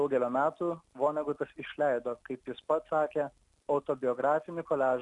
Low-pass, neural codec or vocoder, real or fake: 10.8 kHz; none; real